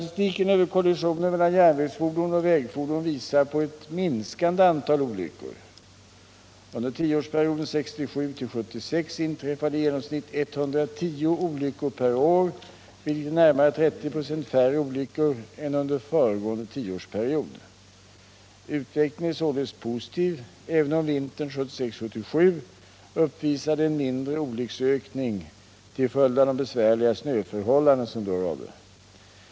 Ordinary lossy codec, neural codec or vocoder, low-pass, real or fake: none; none; none; real